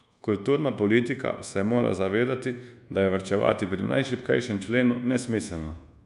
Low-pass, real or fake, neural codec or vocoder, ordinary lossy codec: 10.8 kHz; fake; codec, 24 kHz, 1.2 kbps, DualCodec; none